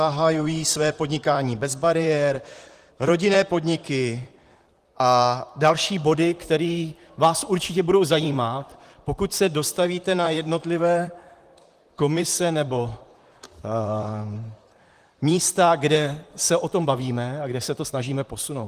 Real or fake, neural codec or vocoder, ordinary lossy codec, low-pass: fake; vocoder, 44.1 kHz, 128 mel bands, Pupu-Vocoder; Opus, 24 kbps; 14.4 kHz